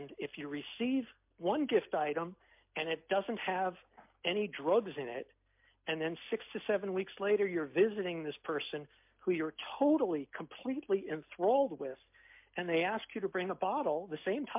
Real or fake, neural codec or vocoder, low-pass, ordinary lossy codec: real; none; 3.6 kHz; MP3, 32 kbps